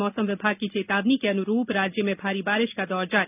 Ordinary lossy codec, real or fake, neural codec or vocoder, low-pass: none; real; none; 3.6 kHz